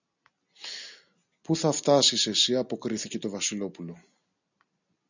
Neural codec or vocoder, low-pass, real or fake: none; 7.2 kHz; real